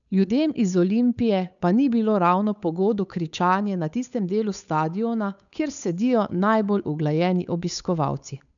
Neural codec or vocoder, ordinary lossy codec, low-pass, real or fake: codec, 16 kHz, 8 kbps, FunCodec, trained on Chinese and English, 25 frames a second; none; 7.2 kHz; fake